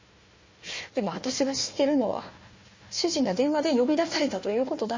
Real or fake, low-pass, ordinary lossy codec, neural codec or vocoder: fake; 7.2 kHz; MP3, 32 kbps; codec, 16 kHz, 1 kbps, FunCodec, trained on Chinese and English, 50 frames a second